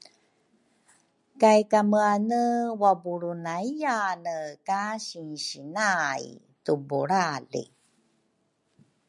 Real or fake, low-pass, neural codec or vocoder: real; 10.8 kHz; none